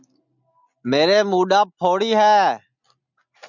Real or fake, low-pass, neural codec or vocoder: real; 7.2 kHz; none